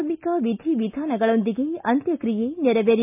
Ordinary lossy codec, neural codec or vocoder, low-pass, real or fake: MP3, 32 kbps; none; 3.6 kHz; real